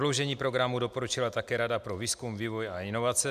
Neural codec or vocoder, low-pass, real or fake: none; 14.4 kHz; real